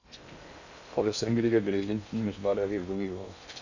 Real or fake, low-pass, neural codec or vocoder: fake; 7.2 kHz; codec, 16 kHz in and 24 kHz out, 0.6 kbps, FocalCodec, streaming, 2048 codes